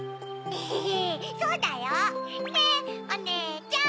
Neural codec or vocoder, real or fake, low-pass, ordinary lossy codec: none; real; none; none